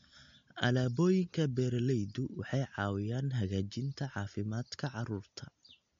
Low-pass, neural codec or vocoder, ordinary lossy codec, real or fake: 7.2 kHz; none; MP3, 48 kbps; real